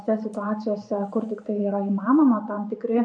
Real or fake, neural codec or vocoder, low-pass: fake; vocoder, 44.1 kHz, 128 mel bands every 512 samples, BigVGAN v2; 9.9 kHz